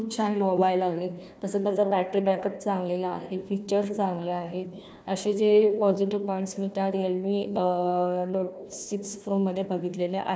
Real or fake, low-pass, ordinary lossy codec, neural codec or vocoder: fake; none; none; codec, 16 kHz, 1 kbps, FunCodec, trained on Chinese and English, 50 frames a second